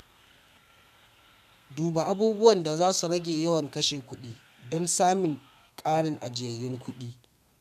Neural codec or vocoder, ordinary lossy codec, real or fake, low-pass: codec, 32 kHz, 1.9 kbps, SNAC; none; fake; 14.4 kHz